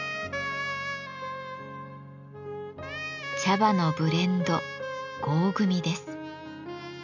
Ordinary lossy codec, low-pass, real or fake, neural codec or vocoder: none; 7.2 kHz; real; none